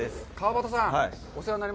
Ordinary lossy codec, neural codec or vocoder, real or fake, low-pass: none; none; real; none